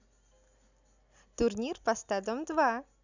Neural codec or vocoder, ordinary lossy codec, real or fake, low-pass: none; none; real; 7.2 kHz